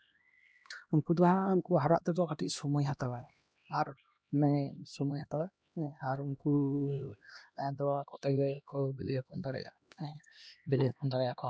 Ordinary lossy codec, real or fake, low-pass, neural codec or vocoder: none; fake; none; codec, 16 kHz, 1 kbps, X-Codec, HuBERT features, trained on LibriSpeech